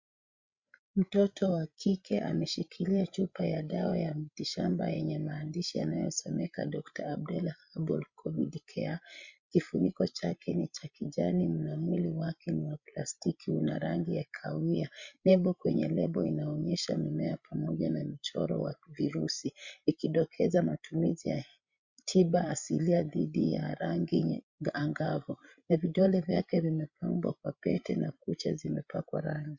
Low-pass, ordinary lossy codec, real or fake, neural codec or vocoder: 7.2 kHz; Opus, 64 kbps; fake; codec, 16 kHz, 16 kbps, FreqCodec, larger model